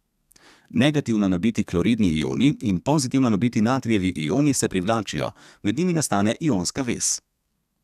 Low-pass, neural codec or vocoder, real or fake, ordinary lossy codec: 14.4 kHz; codec, 32 kHz, 1.9 kbps, SNAC; fake; none